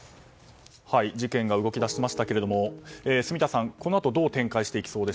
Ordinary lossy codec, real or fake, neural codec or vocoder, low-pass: none; real; none; none